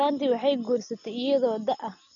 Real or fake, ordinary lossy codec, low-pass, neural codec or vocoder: real; none; 7.2 kHz; none